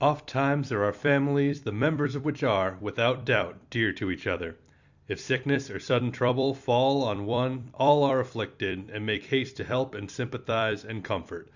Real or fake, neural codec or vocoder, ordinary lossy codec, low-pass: fake; vocoder, 44.1 kHz, 128 mel bands every 256 samples, BigVGAN v2; Opus, 64 kbps; 7.2 kHz